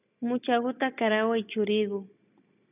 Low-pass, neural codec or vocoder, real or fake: 3.6 kHz; none; real